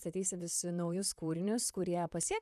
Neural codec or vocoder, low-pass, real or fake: vocoder, 44.1 kHz, 128 mel bands, Pupu-Vocoder; 14.4 kHz; fake